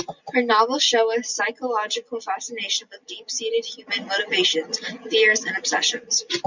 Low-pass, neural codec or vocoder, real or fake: 7.2 kHz; none; real